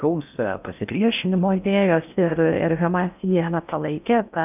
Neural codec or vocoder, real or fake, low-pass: codec, 16 kHz in and 24 kHz out, 0.6 kbps, FocalCodec, streaming, 4096 codes; fake; 3.6 kHz